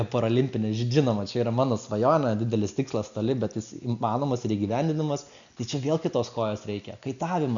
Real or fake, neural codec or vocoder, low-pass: real; none; 7.2 kHz